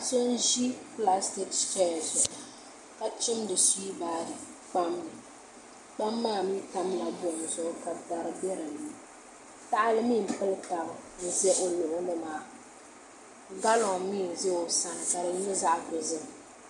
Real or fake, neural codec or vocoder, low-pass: real; none; 10.8 kHz